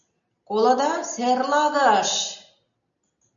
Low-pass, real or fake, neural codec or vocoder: 7.2 kHz; real; none